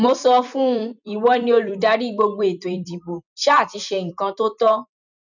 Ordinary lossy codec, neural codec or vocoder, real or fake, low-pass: none; vocoder, 44.1 kHz, 128 mel bands every 256 samples, BigVGAN v2; fake; 7.2 kHz